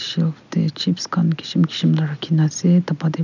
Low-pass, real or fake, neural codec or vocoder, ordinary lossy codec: 7.2 kHz; real; none; none